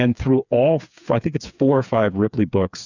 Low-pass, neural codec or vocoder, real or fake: 7.2 kHz; codec, 16 kHz, 4 kbps, FreqCodec, smaller model; fake